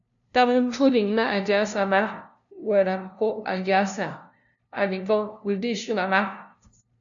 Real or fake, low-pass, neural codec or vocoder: fake; 7.2 kHz; codec, 16 kHz, 0.5 kbps, FunCodec, trained on LibriTTS, 25 frames a second